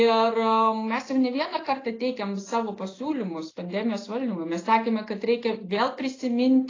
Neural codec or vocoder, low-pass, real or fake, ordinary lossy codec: none; 7.2 kHz; real; AAC, 32 kbps